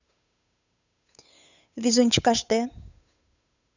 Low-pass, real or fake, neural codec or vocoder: 7.2 kHz; fake; codec, 16 kHz, 8 kbps, FunCodec, trained on Chinese and English, 25 frames a second